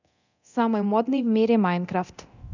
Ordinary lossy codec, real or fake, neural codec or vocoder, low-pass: none; fake; codec, 24 kHz, 0.9 kbps, DualCodec; 7.2 kHz